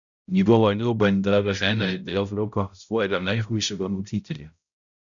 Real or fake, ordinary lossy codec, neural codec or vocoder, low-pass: fake; none; codec, 16 kHz, 0.5 kbps, X-Codec, HuBERT features, trained on balanced general audio; 7.2 kHz